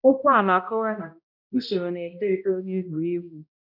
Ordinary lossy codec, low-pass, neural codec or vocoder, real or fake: none; 5.4 kHz; codec, 16 kHz, 0.5 kbps, X-Codec, HuBERT features, trained on balanced general audio; fake